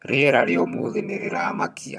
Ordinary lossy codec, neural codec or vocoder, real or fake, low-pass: none; vocoder, 22.05 kHz, 80 mel bands, HiFi-GAN; fake; none